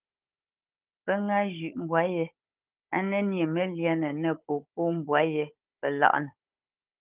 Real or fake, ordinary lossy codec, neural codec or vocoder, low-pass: fake; Opus, 24 kbps; codec, 16 kHz, 16 kbps, FunCodec, trained on Chinese and English, 50 frames a second; 3.6 kHz